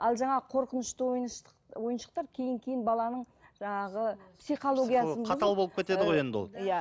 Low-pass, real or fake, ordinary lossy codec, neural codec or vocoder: none; real; none; none